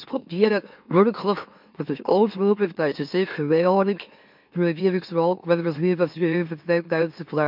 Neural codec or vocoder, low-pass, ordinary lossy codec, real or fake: autoencoder, 44.1 kHz, a latent of 192 numbers a frame, MeloTTS; 5.4 kHz; MP3, 48 kbps; fake